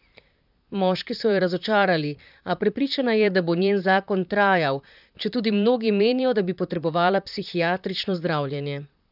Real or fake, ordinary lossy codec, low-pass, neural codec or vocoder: fake; none; 5.4 kHz; vocoder, 44.1 kHz, 128 mel bands, Pupu-Vocoder